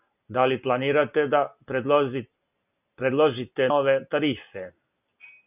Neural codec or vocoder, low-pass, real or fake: none; 3.6 kHz; real